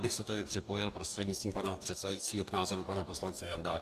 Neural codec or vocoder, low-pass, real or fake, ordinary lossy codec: codec, 44.1 kHz, 2.6 kbps, DAC; 14.4 kHz; fake; Opus, 64 kbps